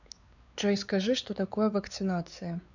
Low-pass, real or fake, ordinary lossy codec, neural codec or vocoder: 7.2 kHz; fake; none; codec, 16 kHz, 4 kbps, X-Codec, WavLM features, trained on Multilingual LibriSpeech